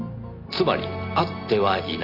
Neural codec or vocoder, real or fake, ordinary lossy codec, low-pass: none; real; none; 5.4 kHz